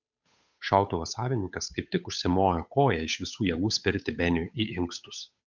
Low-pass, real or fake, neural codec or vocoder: 7.2 kHz; fake; codec, 16 kHz, 8 kbps, FunCodec, trained on Chinese and English, 25 frames a second